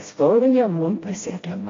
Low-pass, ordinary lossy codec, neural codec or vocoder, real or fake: 7.2 kHz; AAC, 32 kbps; codec, 16 kHz, 1 kbps, FreqCodec, smaller model; fake